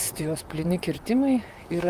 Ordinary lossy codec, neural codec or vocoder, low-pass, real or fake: Opus, 32 kbps; vocoder, 44.1 kHz, 128 mel bands, Pupu-Vocoder; 14.4 kHz; fake